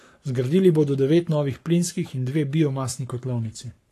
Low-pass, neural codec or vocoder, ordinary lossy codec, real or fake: 14.4 kHz; codec, 44.1 kHz, 7.8 kbps, Pupu-Codec; AAC, 48 kbps; fake